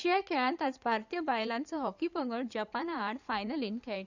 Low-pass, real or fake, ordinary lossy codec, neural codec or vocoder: 7.2 kHz; fake; none; codec, 16 kHz in and 24 kHz out, 2.2 kbps, FireRedTTS-2 codec